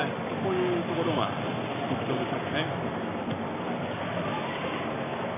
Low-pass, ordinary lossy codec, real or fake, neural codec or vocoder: 3.6 kHz; MP3, 32 kbps; fake; codec, 44.1 kHz, 7.8 kbps, Pupu-Codec